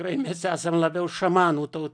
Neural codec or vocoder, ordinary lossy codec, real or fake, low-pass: none; MP3, 96 kbps; real; 9.9 kHz